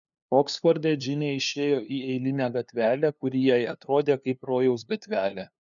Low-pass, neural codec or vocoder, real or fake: 7.2 kHz; codec, 16 kHz, 2 kbps, FunCodec, trained on LibriTTS, 25 frames a second; fake